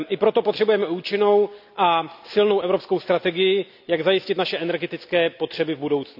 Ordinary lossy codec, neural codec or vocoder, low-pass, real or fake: none; none; 5.4 kHz; real